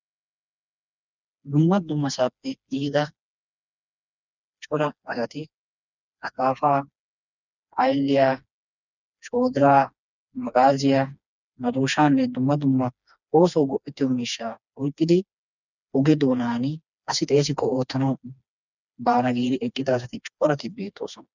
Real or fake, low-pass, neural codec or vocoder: fake; 7.2 kHz; codec, 16 kHz, 2 kbps, FreqCodec, smaller model